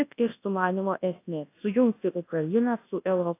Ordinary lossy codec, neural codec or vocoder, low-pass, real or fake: AAC, 24 kbps; codec, 24 kHz, 0.9 kbps, WavTokenizer, large speech release; 3.6 kHz; fake